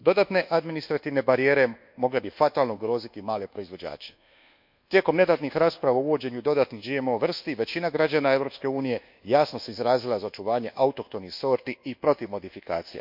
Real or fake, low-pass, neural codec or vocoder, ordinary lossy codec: fake; 5.4 kHz; codec, 24 kHz, 1.2 kbps, DualCodec; MP3, 48 kbps